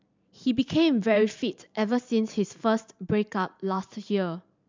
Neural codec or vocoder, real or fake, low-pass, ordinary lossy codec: vocoder, 44.1 kHz, 128 mel bands every 512 samples, BigVGAN v2; fake; 7.2 kHz; MP3, 64 kbps